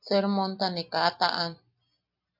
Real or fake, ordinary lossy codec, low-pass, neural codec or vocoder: real; MP3, 48 kbps; 5.4 kHz; none